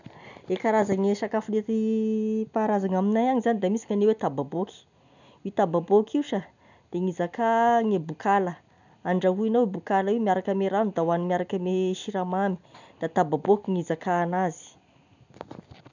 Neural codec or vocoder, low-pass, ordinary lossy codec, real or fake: none; 7.2 kHz; none; real